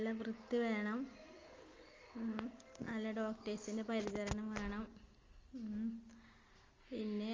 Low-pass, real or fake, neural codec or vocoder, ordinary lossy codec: 7.2 kHz; real; none; Opus, 32 kbps